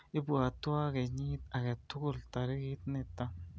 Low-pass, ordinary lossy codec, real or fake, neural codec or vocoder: none; none; real; none